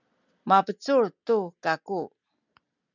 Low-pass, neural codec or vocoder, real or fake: 7.2 kHz; none; real